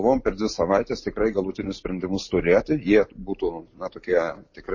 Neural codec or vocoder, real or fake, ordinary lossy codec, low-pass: none; real; MP3, 32 kbps; 7.2 kHz